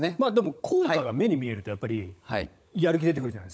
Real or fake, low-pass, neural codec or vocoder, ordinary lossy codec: fake; none; codec, 16 kHz, 16 kbps, FunCodec, trained on LibriTTS, 50 frames a second; none